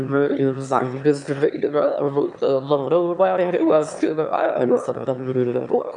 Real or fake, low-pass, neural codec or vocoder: fake; 9.9 kHz; autoencoder, 22.05 kHz, a latent of 192 numbers a frame, VITS, trained on one speaker